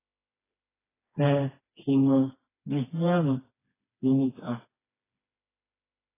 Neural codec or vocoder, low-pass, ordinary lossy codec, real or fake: codec, 16 kHz, 2 kbps, FreqCodec, smaller model; 3.6 kHz; AAC, 16 kbps; fake